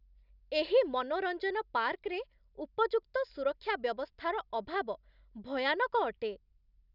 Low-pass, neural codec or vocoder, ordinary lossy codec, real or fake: 5.4 kHz; none; none; real